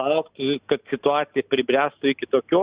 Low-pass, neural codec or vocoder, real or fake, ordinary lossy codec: 3.6 kHz; none; real; Opus, 64 kbps